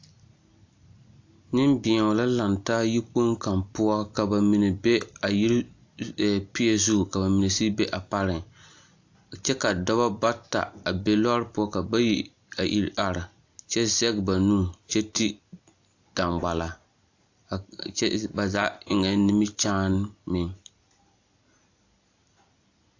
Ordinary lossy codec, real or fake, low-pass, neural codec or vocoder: AAC, 48 kbps; real; 7.2 kHz; none